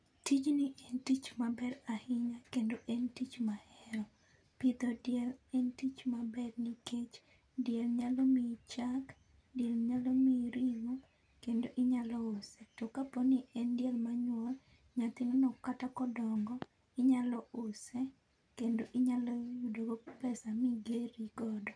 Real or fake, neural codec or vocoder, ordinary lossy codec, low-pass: real; none; none; 9.9 kHz